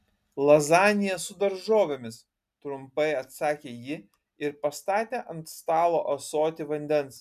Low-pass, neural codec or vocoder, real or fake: 14.4 kHz; none; real